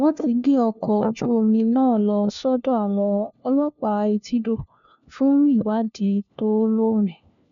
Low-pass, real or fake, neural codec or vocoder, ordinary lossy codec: 7.2 kHz; fake; codec, 16 kHz, 1 kbps, FunCodec, trained on LibriTTS, 50 frames a second; none